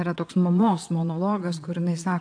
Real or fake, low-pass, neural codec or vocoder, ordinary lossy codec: fake; 9.9 kHz; vocoder, 22.05 kHz, 80 mel bands, Vocos; AAC, 64 kbps